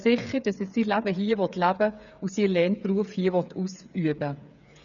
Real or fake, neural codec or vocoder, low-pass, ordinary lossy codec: fake; codec, 16 kHz, 8 kbps, FreqCodec, smaller model; 7.2 kHz; none